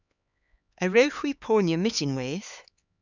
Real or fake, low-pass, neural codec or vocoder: fake; 7.2 kHz; codec, 16 kHz, 4 kbps, X-Codec, HuBERT features, trained on LibriSpeech